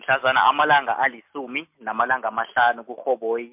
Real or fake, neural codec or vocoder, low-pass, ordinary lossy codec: real; none; 3.6 kHz; MP3, 32 kbps